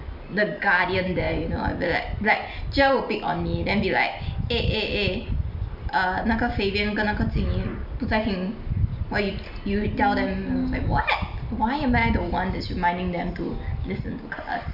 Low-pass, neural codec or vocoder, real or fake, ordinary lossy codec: 5.4 kHz; none; real; none